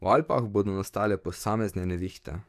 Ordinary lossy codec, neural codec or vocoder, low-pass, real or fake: none; codec, 44.1 kHz, 7.8 kbps, DAC; 14.4 kHz; fake